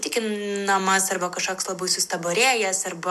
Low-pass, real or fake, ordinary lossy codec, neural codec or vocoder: 14.4 kHz; real; MP3, 96 kbps; none